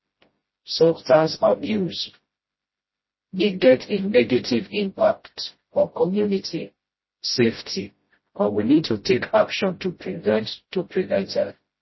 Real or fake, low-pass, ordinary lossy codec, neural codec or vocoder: fake; 7.2 kHz; MP3, 24 kbps; codec, 16 kHz, 0.5 kbps, FreqCodec, smaller model